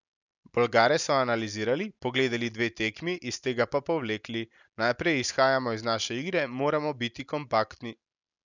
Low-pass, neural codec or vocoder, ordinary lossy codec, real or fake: 7.2 kHz; none; none; real